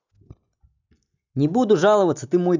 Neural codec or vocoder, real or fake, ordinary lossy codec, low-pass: none; real; none; 7.2 kHz